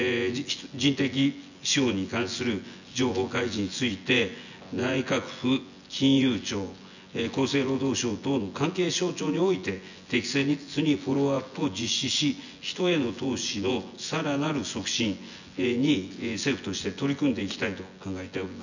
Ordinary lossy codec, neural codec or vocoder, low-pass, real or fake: none; vocoder, 24 kHz, 100 mel bands, Vocos; 7.2 kHz; fake